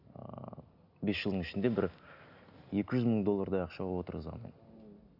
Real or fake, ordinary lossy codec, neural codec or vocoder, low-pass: real; none; none; 5.4 kHz